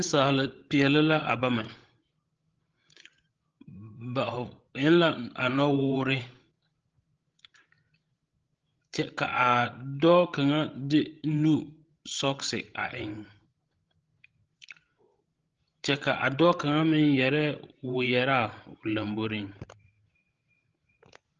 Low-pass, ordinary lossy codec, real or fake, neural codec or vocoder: 7.2 kHz; Opus, 16 kbps; fake; codec, 16 kHz, 16 kbps, FreqCodec, larger model